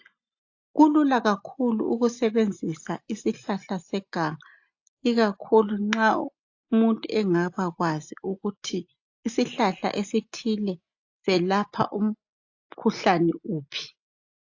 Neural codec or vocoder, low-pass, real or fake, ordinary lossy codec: none; 7.2 kHz; real; AAC, 48 kbps